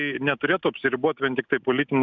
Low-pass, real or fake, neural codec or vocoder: 7.2 kHz; real; none